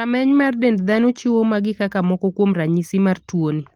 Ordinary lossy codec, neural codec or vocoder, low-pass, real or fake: Opus, 24 kbps; none; 19.8 kHz; real